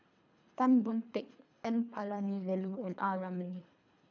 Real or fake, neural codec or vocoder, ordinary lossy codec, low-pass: fake; codec, 24 kHz, 3 kbps, HILCodec; none; 7.2 kHz